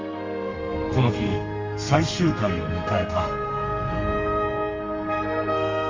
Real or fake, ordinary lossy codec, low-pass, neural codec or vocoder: fake; Opus, 32 kbps; 7.2 kHz; codec, 32 kHz, 1.9 kbps, SNAC